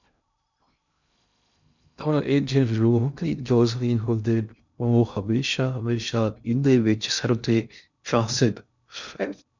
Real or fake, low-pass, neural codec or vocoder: fake; 7.2 kHz; codec, 16 kHz in and 24 kHz out, 0.6 kbps, FocalCodec, streaming, 2048 codes